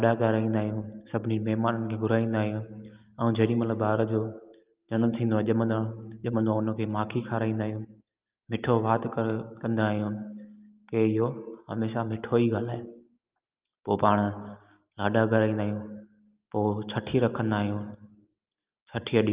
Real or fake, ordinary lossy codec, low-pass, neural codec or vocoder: real; Opus, 32 kbps; 3.6 kHz; none